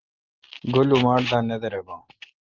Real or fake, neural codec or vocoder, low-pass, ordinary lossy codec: real; none; 7.2 kHz; Opus, 16 kbps